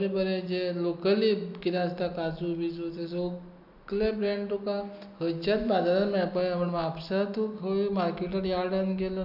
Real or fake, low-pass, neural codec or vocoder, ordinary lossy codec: real; 5.4 kHz; none; none